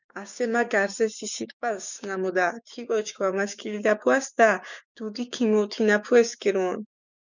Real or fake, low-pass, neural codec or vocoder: fake; 7.2 kHz; codec, 16 kHz, 6 kbps, DAC